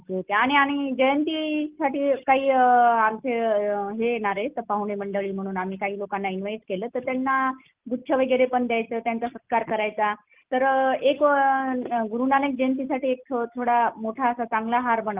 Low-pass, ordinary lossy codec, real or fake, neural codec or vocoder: 3.6 kHz; Opus, 32 kbps; real; none